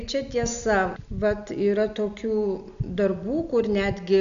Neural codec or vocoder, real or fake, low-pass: none; real; 7.2 kHz